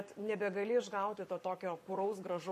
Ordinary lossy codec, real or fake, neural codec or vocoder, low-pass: MP3, 64 kbps; fake; codec, 44.1 kHz, 7.8 kbps, Pupu-Codec; 14.4 kHz